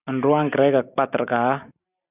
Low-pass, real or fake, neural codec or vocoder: 3.6 kHz; real; none